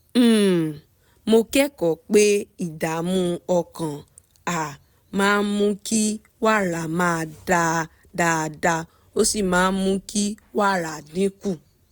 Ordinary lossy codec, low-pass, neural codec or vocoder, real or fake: none; none; none; real